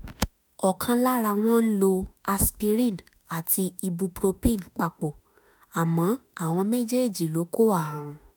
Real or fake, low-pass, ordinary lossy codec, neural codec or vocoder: fake; none; none; autoencoder, 48 kHz, 32 numbers a frame, DAC-VAE, trained on Japanese speech